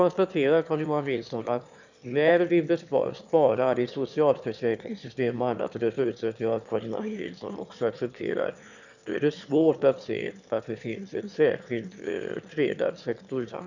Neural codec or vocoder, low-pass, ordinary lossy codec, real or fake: autoencoder, 22.05 kHz, a latent of 192 numbers a frame, VITS, trained on one speaker; 7.2 kHz; none; fake